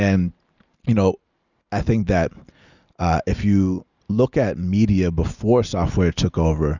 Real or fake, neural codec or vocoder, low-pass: real; none; 7.2 kHz